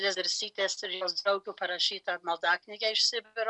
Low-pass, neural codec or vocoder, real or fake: 10.8 kHz; none; real